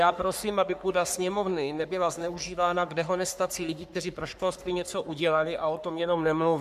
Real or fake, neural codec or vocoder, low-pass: fake; codec, 44.1 kHz, 3.4 kbps, Pupu-Codec; 14.4 kHz